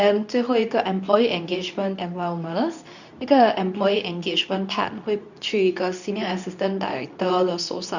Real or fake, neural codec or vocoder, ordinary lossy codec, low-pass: fake; codec, 24 kHz, 0.9 kbps, WavTokenizer, medium speech release version 2; none; 7.2 kHz